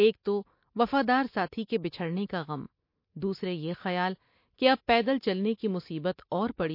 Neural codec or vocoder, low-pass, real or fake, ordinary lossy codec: none; 5.4 kHz; real; MP3, 32 kbps